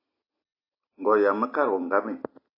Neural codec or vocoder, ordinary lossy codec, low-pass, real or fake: none; AAC, 32 kbps; 5.4 kHz; real